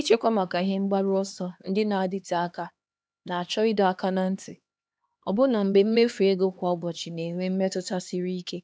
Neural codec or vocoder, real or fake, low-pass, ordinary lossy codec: codec, 16 kHz, 2 kbps, X-Codec, HuBERT features, trained on LibriSpeech; fake; none; none